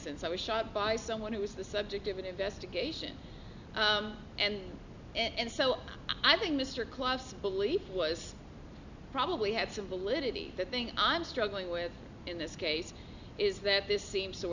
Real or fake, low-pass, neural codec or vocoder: real; 7.2 kHz; none